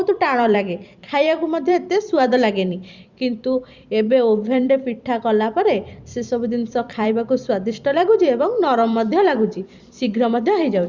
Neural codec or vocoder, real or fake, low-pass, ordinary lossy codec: vocoder, 44.1 kHz, 128 mel bands every 256 samples, BigVGAN v2; fake; 7.2 kHz; Opus, 64 kbps